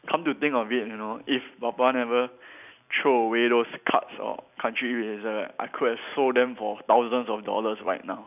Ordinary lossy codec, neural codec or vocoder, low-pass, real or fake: none; none; 3.6 kHz; real